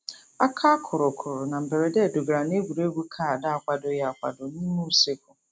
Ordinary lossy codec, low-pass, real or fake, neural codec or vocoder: none; none; real; none